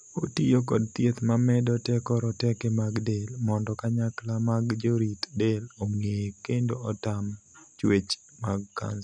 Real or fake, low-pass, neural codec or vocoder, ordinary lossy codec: real; 9.9 kHz; none; none